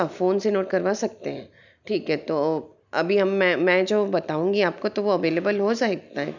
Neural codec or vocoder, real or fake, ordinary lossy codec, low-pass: none; real; none; 7.2 kHz